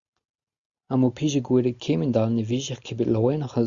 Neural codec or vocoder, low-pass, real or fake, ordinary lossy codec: none; 7.2 kHz; real; Opus, 64 kbps